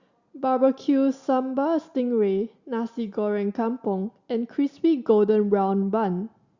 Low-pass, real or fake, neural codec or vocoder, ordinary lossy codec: 7.2 kHz; real; none; Opus, 64 kbps